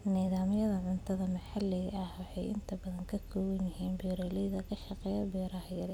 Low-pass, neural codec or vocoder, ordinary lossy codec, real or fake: 19.8 kHz; none; none; real